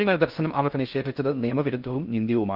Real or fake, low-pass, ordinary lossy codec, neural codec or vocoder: fake; 5.4 kHz; Opus, 24 kbps; codec, 16 kHz in and 24 kHz out, 0.6 kbps, FocalCodec, streaming, 2048 codes